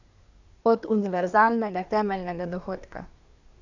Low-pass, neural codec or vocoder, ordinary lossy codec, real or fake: 7.2 kHz; codec, 24 kHz, 1 kbps, SNAC; none; fake